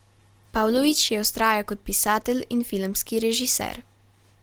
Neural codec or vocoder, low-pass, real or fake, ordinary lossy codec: none; 19.8 kHz; real; Opus, 16 kbps